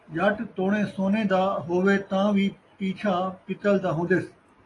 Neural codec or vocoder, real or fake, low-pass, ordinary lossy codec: none; real; 10.8 kHz; AAC, 32 kbps